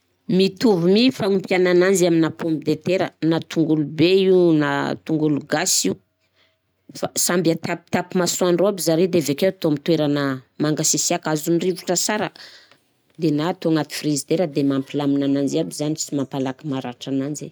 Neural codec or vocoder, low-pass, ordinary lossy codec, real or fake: none; none; none; real